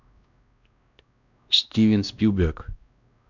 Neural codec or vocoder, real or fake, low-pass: codec, 16 kHz, 1 kbps, X-Codec, WavLM features, trained on Multilingual LibriSpeech; fake; 7.2 kHz